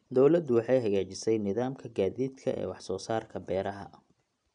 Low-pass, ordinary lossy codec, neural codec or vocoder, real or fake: 9.9 kHz; none; none; real